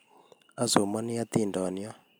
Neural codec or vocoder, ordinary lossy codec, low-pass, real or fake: none; none; none; real